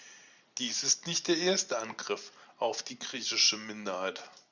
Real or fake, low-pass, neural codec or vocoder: real; 7.2 kHz; none